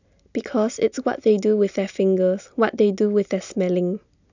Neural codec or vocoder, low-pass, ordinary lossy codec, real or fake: none; 7.2 kHz; none; real